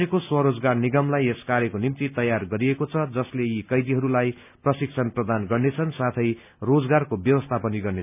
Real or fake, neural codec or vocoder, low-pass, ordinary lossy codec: real; none; 3.6 kHz; none